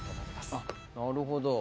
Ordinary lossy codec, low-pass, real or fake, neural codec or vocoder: none; none; real; none